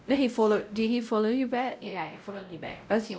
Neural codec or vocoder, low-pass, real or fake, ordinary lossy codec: codec, 16 kHz, 0.5 kbps, X-Codec, WavLM features, trained on Multilingual LibriSpeech; none; fake; none